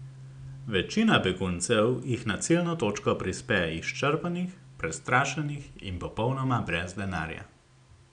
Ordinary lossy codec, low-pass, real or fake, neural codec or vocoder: none; 9.9 kHz; real; none